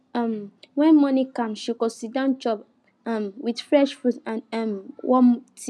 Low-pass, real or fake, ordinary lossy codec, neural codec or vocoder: none; real; none; none